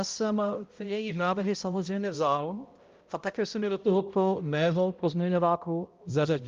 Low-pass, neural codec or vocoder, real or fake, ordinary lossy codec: 7.2 kHz; codec, 16 kHz, 0.5 kbps, X-Codec, HuBERT features, trained on balanced general audio; fake; Opus, 32 kbps